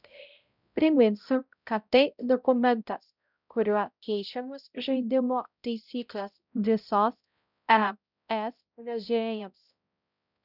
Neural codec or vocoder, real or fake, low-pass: codec, 16 kHz, 0.5 kbps, X-Codec, HuBERT features, trained on balanced general audio; fake; 5.4 kHz